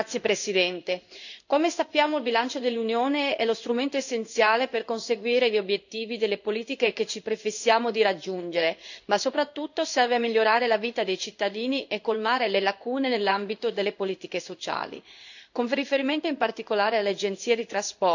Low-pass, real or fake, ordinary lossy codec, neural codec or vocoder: 7.2 kHz; fake; none; codec, 16 kHz in and 24 kHz out, 1 kbps, XY-Tokenizer